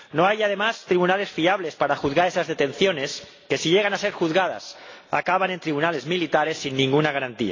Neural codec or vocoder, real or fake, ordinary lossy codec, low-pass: none; real; AAC, 32 kbps; 7.2 kHz